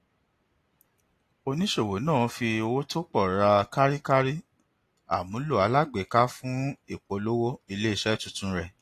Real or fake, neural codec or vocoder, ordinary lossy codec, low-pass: real; none; AAC, 48 kbps; 14.4 kHz